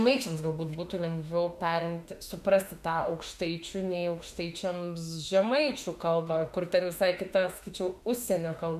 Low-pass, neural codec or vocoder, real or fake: 14.4 kHz; autoencoder, 48 kHz, 32 numbers a frame, DAC-VAE, trained on Japanese speech; fake